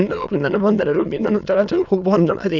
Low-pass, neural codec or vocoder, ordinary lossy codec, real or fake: 7.2 kHz; autoencoder, 22.05 kHz, a latent of 192 numbers a frame, VITS, trained on many speakers; none; fake